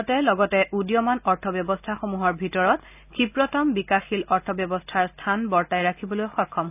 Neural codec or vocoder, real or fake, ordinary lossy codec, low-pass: none; real; none; 3.6 kHz